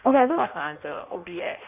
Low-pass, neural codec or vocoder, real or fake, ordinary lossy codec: 3.6 kHz; codec, 16 kHz in and 24 kHz out, 0.6 kbps, FireRedTTS-2 codec; fake; none